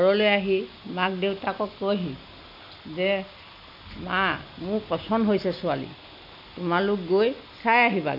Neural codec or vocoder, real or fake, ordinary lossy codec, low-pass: none; real; none; 5.4 kHz